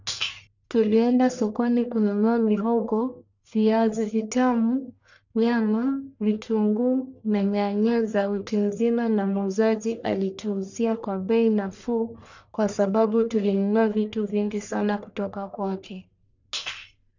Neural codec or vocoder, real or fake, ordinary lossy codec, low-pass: codec, 44.1 kHz, 1.7 kbps, Pupu-Codec; fake; none; 7.2 kHz